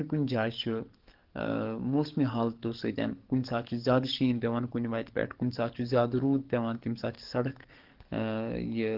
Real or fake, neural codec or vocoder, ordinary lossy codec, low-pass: fake; codec, 44.1 kHz, 7.8 kbps, DAC; Opus, 16 kbps; 5.4 kHz